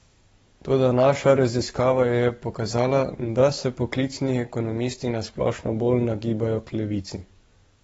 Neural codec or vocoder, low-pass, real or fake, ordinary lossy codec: codec, 44.1 kHz, 7.8 kbps, DAC; 19.8 kHz; fake; AAC, 24 kbps